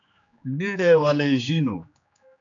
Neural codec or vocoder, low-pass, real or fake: codec, 16 kHz, 2 kbps, X-Codec, HuBERT features, trained on general audio; 7.2 kHz; fake